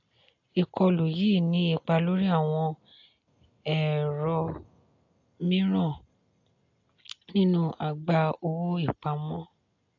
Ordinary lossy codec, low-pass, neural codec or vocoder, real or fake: AAC, 48 kbps; 7.2 kHz; none; real